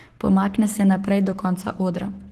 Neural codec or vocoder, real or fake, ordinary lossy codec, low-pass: codec, 44.1 kHz, 7.8 kbps, Pupu-Codec; fake; Opus, 16 kbps; 14.4 kHz